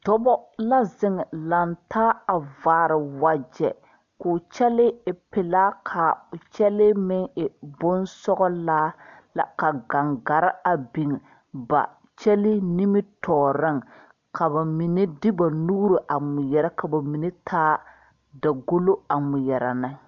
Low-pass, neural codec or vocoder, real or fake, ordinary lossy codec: 7.2 kHz; none; real; MP3, 64 kbps